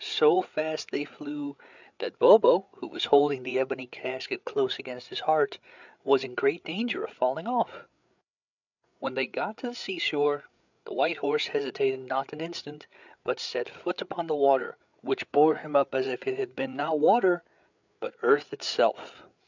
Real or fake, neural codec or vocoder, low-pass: fake; codec, 16 kHz, 8 kbps, FreqCodec, larger model; 7.2 kHz